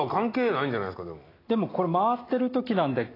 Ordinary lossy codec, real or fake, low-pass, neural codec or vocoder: AAC, 24 kbps; real; 5.4 kHz; none